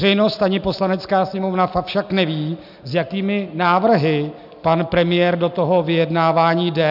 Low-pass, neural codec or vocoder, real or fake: 5.4 kHz; none; real